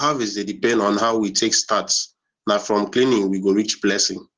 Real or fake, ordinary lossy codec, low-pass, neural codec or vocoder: real; Opus, 16 kbps; 7.2 kHz; none